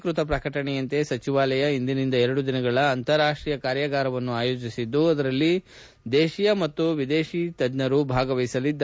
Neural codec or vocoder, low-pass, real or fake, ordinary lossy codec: none; none; real; none